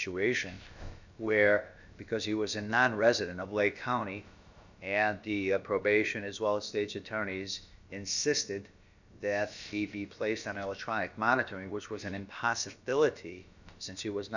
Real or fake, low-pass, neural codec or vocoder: fake; 7.2 kHz; codec, 16 kHz, about 1 kbps, DyCAST, with the encoder's durations